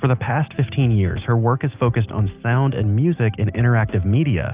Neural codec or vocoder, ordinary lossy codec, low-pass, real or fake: none; Opus, 24 kbps; 3.6 kHz; real